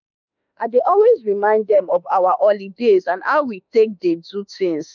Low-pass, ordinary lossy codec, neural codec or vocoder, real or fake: 7.2 kHz; none; autoencoder, 48 kHz, 32 numbers a frame, DAC-VAE, trained on Japanese speech; fake